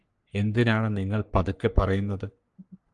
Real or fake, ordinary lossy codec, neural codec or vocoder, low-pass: fake; Opus, 32 kbps; codec, 44.1 kHz, 3.4 kbps, Pupu-Codec; 10.8 kHz